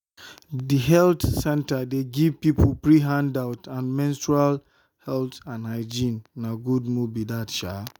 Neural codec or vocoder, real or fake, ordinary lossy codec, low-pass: none; real; none; none